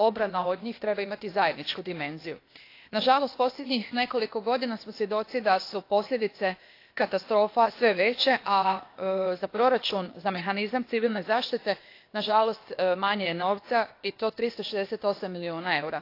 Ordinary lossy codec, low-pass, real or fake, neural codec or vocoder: AAC, 32 kbps; 5.4 kHz; fake; codec, 16 kHz, 0.8 kbps, ZipCodec